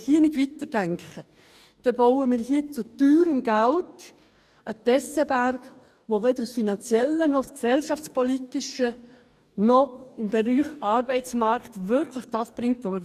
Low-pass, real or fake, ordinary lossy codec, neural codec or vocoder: 14.4 kHz; fake; none; codec, 44.1 kHz, 2.6 kbps, DAC